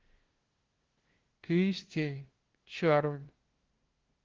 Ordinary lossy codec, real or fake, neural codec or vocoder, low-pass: Opus, 16 kbps; fake; codec, 16 kHz, 0.5 kbps, FunCodec, trained on LibriTTS, 25 frames a second; 7.2 kHz